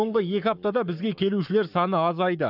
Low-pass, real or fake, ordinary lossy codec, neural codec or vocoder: 5.4 kHz; fake; none; codec, 44.1 kHz, 7.8 kbps, Pupu-Codec